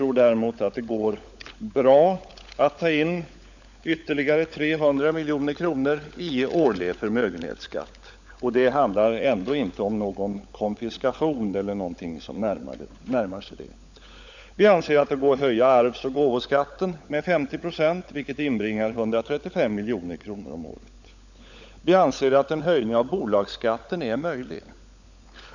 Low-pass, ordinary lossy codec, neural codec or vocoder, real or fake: 7.2 kHz; none; codec, 16 kHz, 16 kbps, FunCodec, trained on LibriTTS, 50 frames a second; fake